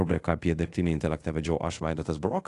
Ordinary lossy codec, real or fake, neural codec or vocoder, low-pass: AAC, 48 kbps; fake; codec, 24 kHz, 0.5 kbps, DualCodec; 10.8 kHz